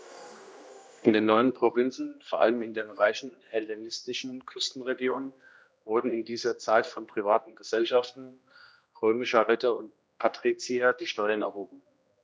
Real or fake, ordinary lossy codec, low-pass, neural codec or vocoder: fake; none; none; codec, 16 kHz, 1 kbps, X-Codec, HuBERT features, trained on general audio